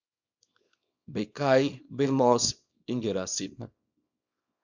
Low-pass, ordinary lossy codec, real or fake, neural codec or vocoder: 7.2 kHz; MP3, 64 kbps; fake; codec, 24 kHz, 0.9 kbps, WavTokenizer, small release